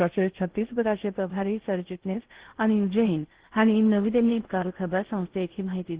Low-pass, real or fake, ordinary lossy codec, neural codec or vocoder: 3.6 kHz; fake; Opus, 16 kbps; codec, 16 kHz in and 24 kHz out, 0.6 kbps, FocalCodec, streaming, 2048 codes